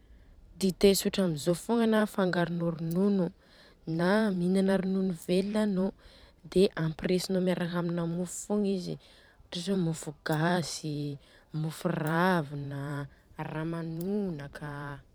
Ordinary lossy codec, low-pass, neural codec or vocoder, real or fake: none; none; vocoder, 44.1 kHz, 128 mel bands every 512 samples, BigVGAN v2; fake